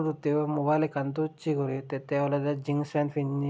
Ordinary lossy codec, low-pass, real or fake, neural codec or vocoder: none; none; real; none